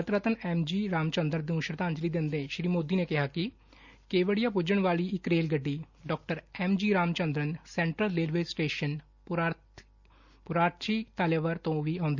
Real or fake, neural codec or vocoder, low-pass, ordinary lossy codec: real; none; 7.2 kHz; Opus, 64 kbps